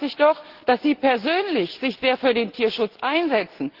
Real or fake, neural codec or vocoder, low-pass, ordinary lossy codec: real; none; 5.4 kHz; Opus, 16 kbps